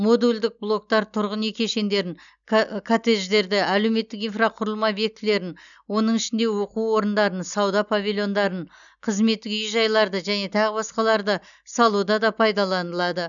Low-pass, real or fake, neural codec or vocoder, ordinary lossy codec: 7.2 kHz; real; none; none